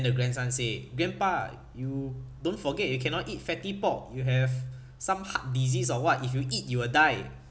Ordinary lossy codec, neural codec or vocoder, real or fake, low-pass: none; none; real; none